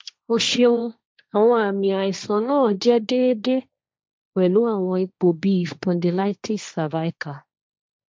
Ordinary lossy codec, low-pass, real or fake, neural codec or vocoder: none; none; fake; codec, 16 kHz, 1.1 kbps, Voila-Tokenizer